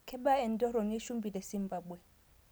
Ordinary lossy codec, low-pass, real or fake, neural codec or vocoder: none; none; real; none